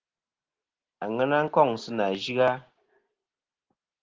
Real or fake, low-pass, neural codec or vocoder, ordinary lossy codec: real; 7.2 kHz; none; Opus, 16 kbps